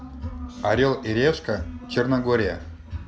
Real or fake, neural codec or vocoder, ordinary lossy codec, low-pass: real; none; none; none